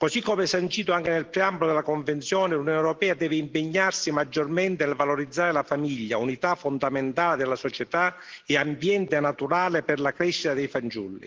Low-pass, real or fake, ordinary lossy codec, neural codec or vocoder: 7.2 kHz; real; Opus, 16 kbps; none